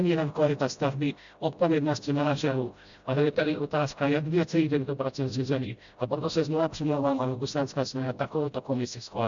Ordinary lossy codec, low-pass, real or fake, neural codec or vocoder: Opus, 64 kbps; 7.2 kHz; fake; codec, 16 kHz, 0.5 kbps, FreqCodec, smaller model